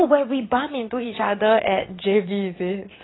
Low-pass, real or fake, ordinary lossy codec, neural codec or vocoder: 7.2 kHz; fake; AAC, 16 kbps; vocoder, 22.05 kHz, 80 mel bands, WaveNeXt